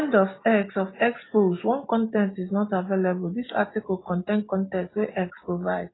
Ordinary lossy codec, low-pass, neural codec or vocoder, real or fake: AAC, 16 kbps; 7.2 kHz; none; real